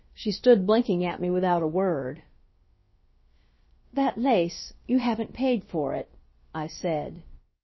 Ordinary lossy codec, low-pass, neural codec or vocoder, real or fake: MP3, 24 kbps; 7.2 kHz; codec, 16 kHz, about 1 kbps, DyCAST, with the encoder's durations; fake